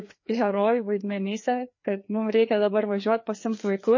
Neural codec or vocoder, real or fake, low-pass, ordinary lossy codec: codec, 16 kHz, 2 kbps, FreqCodec, larger model; fake; 7.2 kHz; MP3, 32 kbps